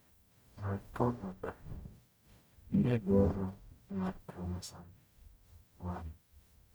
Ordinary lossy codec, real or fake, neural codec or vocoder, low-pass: none; fake; codec, 44.1 kHz, 0.9 kbps, DAC; none